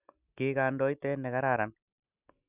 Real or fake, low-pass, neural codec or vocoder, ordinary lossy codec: real; 3.6 kHz; none; none